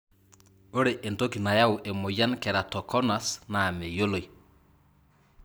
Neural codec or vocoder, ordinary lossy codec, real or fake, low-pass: none; none; real; none